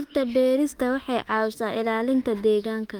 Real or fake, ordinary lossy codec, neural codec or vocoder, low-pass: fake; Opus, 32 kbps; autoencoder, 48 kHz, 32 numbers a frame, DAC-VAE, trained on Japanese speech; 19.8 kHz